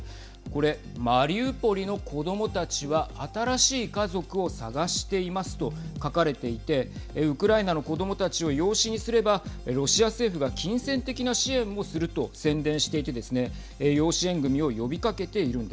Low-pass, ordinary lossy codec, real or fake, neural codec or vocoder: none; none; real; none